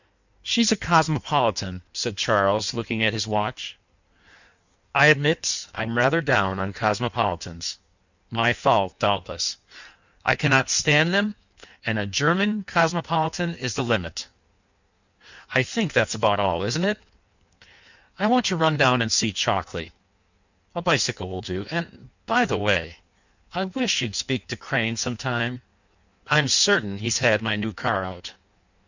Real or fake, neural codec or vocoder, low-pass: fake; codec, 16 kHz in and 24 kHz out, 1.1 kbps, FireRedTTS-2 codec; 7.2 kHz